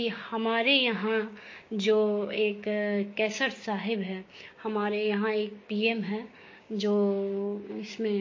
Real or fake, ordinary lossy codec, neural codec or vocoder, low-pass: fake; MP3, 32 kbps; codec, 16 kHz, 6 kbps, DAC; 7.2 kHz